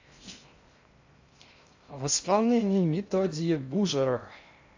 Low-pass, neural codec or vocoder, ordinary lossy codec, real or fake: 7.2 kHz; codec, 16 kHz in and 24 kHz out, 0.6 kbps, FocalCodec, streaming, 2048 codes; AAC, 48 kbps; fake